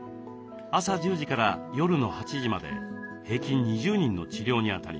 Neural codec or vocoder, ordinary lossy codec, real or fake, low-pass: none; none; real; none